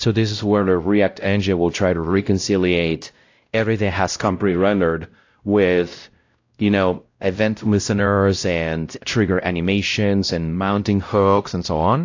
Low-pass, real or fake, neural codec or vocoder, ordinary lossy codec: 7.2 kHz; fake; codec, 16 kHz, 0.5 kbps, X-Codec, WavLM features, trained on Multilingual LibriSpeech; AAC, 48 kbps